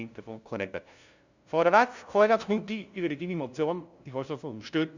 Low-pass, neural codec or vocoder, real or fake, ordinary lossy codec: 7.2 kHz; codec, 16 kHz, 0.5 kbps, FunCodec, trained on LibriTTS, 25 frames a second; fake; Opus, 64 kbps